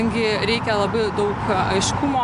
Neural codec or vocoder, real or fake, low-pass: none; real; 10.8 kHz